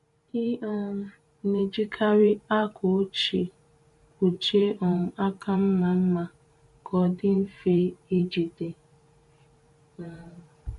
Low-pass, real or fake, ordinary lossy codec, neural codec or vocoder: 14.4 kHz; fake; MP3, 48 kbps; vocoder, 44.1 kHz, 128 mel bands every 512 samples, BigVGAN v2